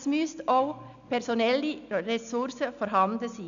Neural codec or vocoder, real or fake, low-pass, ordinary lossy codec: none; real; 7.2 kHz; none